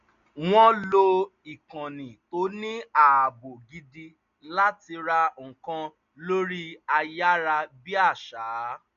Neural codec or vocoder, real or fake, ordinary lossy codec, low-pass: none; real; none; 7.2 kHz